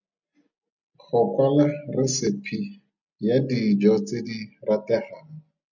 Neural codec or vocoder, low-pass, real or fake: none; 7.2 kHz; real